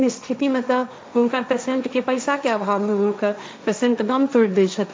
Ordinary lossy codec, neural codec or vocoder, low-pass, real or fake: none; codec, 16 kHz, 1.1 kbps, Voila-Tokenizer; none; fake